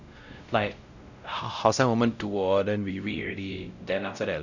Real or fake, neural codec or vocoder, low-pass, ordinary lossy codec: fake; codec, 16 kHz, 0.5 kbps, X-Codec, WavLM features, trained on Multilingual LibriSpeech; 7.2 kHz; none